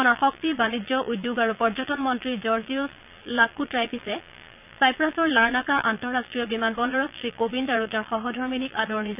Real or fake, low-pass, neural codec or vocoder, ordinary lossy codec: fake; 3.6 kHz; vocoder, 22.05 kHz, 80 mel bands, Vocos; none